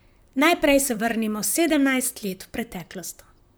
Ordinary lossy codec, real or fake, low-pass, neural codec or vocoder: none; fake; none; vocoder, 44.1 kHz, 128 mel bands, Pupu-Vocoder